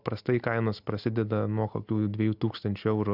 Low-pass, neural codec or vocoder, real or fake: 5.4 kHz; none; real